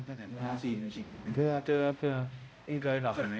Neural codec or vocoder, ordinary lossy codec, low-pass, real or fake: codec, 16 kHz, 0.5 kbps, X-Codec, HuBERT features, trained on balanced general audio; none; none; fake